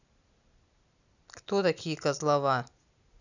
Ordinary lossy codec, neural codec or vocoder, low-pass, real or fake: none; none; 7.2 kHz; real